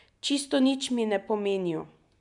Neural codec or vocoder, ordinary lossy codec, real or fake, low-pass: none; MP3, 96 kbps; real; 10.8 kHz